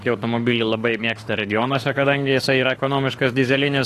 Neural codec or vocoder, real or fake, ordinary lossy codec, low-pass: autoencoder, 48 kHz, 32 numbers a frame, DAC-VAE, trained on Japanese speech; fake; AAC, 48 kbps; 14.4 kHz